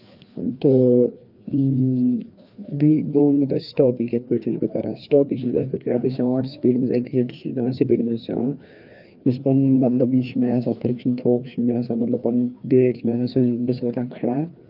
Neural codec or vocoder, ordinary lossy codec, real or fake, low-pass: codec, 16 kHz, 2 kbps, FreqCodec, larger model; Opus, 24 kbps; fake; 5.4 kHz